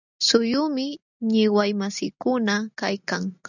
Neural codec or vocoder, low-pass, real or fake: none; 7.2 kHz; real